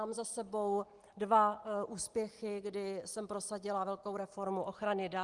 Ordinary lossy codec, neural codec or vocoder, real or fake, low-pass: Opus, 24 kbps; none; real; 10.8 kHz